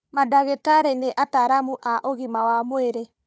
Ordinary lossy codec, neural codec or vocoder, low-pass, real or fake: none; codec, 16 kHz, 4 kbps, FunCodec, trained on Chinese and English, 50 frames a second; none; fake